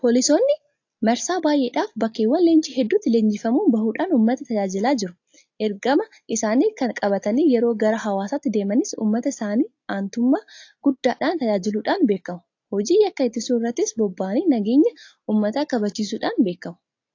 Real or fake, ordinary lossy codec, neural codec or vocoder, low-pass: real; AAC, 48 kbps; none; 7.2 kHz